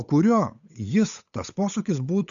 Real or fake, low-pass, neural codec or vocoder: fake; 7.2 kHz; codec, 16 kHz, 8 kbps, FunCodec, trained on Chinese and English, 25 frames a second